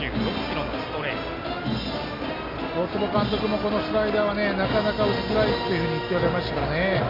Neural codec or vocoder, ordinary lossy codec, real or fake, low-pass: none; AAC, 24 kbps; real; 5.4 kHz